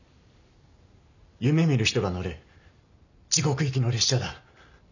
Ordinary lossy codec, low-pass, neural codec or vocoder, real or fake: none; 7.2 kHz; none; real